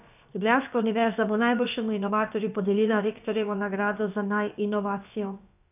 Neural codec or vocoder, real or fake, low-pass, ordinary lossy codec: codec, 16 kHz, about 1 kbps, DyCAST, with the encoder's durations; fake; 3.6 kHz; none